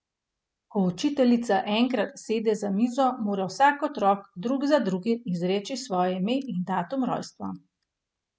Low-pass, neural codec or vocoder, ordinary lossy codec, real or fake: none; none; none; real